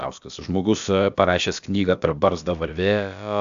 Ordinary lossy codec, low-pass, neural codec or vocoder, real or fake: AAC, 96 kbps; 7.2 kHz; codec, 16 kHz, about 1 kbps, DyCAST, with the encoder's durations; fake